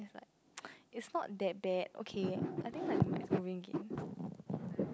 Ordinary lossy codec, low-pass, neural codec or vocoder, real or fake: none; none; none; real